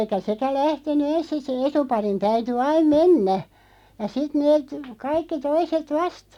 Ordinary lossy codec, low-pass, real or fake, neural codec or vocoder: none; 19.8 kHz; real; none